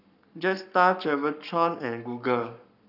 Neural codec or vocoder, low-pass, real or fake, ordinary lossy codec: codec, 44.1 kHz, 7.8 kbps, Pupu-Codec; 5.4 kHz; fake; none